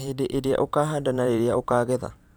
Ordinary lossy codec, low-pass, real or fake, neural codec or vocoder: none; none; fake; vocoder, 44.1 kHz, 128 mel bands every 512 samples, BigVGAN v2